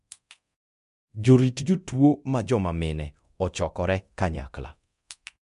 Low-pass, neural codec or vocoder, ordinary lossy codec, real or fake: 10.8 kHz; codec, 24 kHz, 0.9 kbps, DualCodec; MP3, 64 kbps; fake